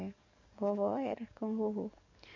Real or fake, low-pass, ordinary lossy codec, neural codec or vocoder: fake; 7.2 kHz; MP3, 64 kbps; codec, 44.1 kHz, 7.8 kbps, DAC